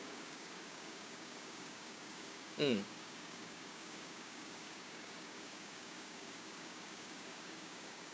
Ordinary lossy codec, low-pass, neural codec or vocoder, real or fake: none; none; codec, 16 kHz, 6 kbps, DAC; fake